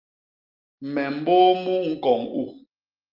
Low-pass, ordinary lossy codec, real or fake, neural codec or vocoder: 5.4 kHz; Opus, 24 kbps; real; none